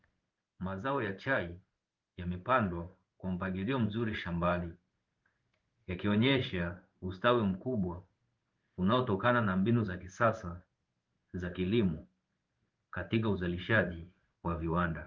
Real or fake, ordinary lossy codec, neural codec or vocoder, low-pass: fake; Opus, 32 kbps; codec, 16 kHz in and 24 kHz out, 1 kbps, XY-Tokenizer; 7.2 kHz